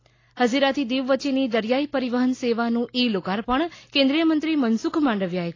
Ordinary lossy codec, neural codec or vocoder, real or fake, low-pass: AAC, 32 kbps; none; real; 7.2 kHz